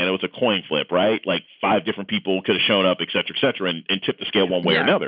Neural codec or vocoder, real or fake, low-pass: none; real; 5.4 kHz